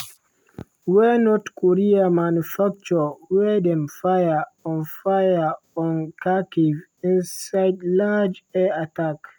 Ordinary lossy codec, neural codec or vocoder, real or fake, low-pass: none; none; real; none